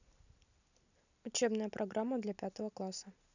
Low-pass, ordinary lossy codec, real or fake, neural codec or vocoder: 7.2 kHz; none; real; none